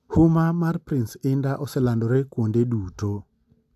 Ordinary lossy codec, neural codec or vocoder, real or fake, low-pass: none; none; real; 14.4 kHz